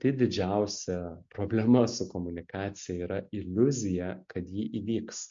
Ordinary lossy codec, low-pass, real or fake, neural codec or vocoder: MP3, 48 kbps; 7.2 kHz; real; none